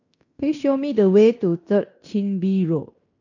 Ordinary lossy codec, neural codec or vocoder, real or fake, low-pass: none; codec, 16 kHz in and 24 kHz out, 0.9 kbps, LongCat-Audio-Codec, fine tuned four codebook decoder; fake; 7.2 kHz